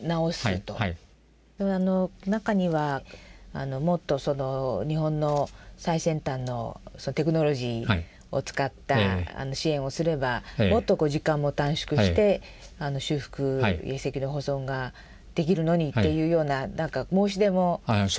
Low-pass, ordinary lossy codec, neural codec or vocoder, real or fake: none; none; none; real